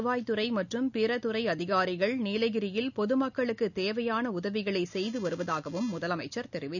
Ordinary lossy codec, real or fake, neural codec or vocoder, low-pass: none; real; none; 7.2 kHz